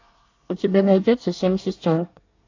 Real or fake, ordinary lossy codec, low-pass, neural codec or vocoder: fake; AAC, 48 kbps; 7.2 kHz; codec, 24 kHz, 1 kbps, SNAC